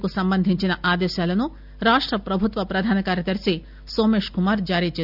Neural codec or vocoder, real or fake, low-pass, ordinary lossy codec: none; real; 5.4 kHz; none